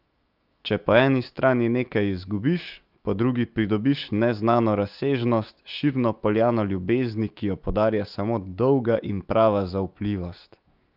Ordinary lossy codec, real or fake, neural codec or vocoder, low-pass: Opus, 24 kbps; fake; autoencoder, 48 kHz, 128 numbers a frame, DAC-VAE, trained on Japanese speech; 5.4 kHz